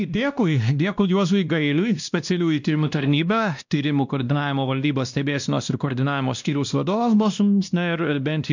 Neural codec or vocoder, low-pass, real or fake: codec, 16 kHz, 1 kbps, X-Codec, WavLM features, trained on Multilingual LibriSpeech; 7.2 kHz; fake